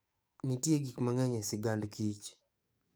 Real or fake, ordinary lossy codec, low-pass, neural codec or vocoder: fake; none; none; codec, 44.1 kHz, 7.8 kbps, DAC